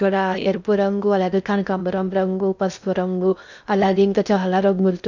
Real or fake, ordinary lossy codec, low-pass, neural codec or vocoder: fake; none; 7.2 kHz; codec, 16 kHz in and 24 kHz out, 0.6 kbps, FocalCodec, streaming, 2048 codes